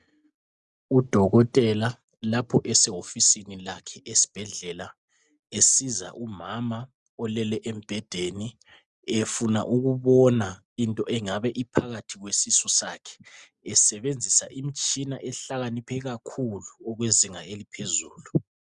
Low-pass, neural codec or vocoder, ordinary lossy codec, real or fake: 10.8 kHz; none; Opus, 64 kbps; real